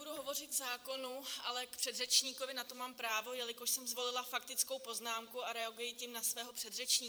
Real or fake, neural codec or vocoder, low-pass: fake; vocoder, 44.1 kHz, 128 mel bands every 512 samples, BigVGAN v2; 19.8 kHz